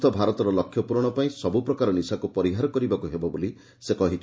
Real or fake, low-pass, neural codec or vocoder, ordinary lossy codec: real; none; none; none